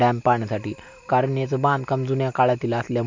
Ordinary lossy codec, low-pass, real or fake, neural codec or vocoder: MP3, 48 kbps; 7.2 kHz; real; none